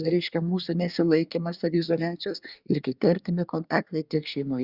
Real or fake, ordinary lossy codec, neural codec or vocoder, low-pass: fake; Opus, 64 kbps; codec, 24 kHz, 1 kbps, SNAC; 5.4 kHz